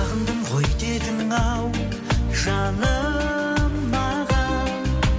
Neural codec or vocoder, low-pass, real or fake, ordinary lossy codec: none; none; real; none